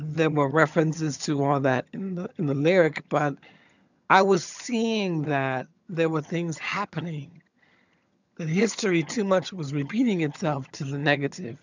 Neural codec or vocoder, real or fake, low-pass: vocoder, 22.05 kHz, 80 mel bands, HiFi-GAN; fake; 7.2 kHz